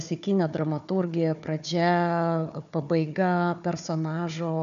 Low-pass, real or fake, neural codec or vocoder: 7.2 kHz; fake; codec, 16 kHz, 4 kbps, FunCodec, trained on Chinese and English, 50 frames a second